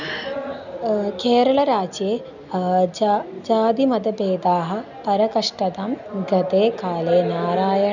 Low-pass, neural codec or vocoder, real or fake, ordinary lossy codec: 7.2 kHz; none; real; none